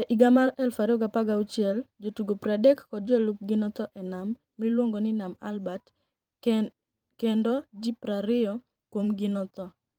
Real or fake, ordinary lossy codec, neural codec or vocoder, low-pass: fake; Opus, 32 kbps; vocoder, 44.1 kHz, 128 mel bands every 512 samples, BigVGAN v2; 19.8 kHz